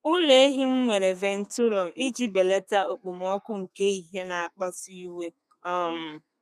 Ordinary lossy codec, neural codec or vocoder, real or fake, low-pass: none; codec, 32 kHz, 1.9 kbps, SNAC; fake; 14.4 kHz